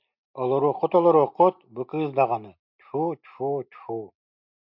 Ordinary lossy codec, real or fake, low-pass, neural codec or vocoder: MP3, 48 kbps; real; 5.4 kHz; none